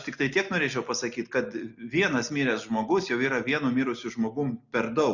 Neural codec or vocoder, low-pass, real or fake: none; 7.2 kHz; real